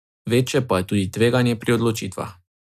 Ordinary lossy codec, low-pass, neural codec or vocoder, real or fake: none; 14.4 kHz; none; real